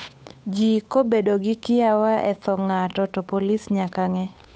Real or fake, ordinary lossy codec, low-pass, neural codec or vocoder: fake; none; none; codec, 16 kHz, 8 kbps, FunCodec, trained on Chinese and English, 25 frames a second